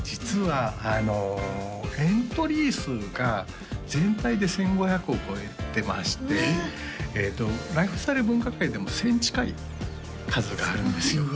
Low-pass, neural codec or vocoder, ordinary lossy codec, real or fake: none; none; none; real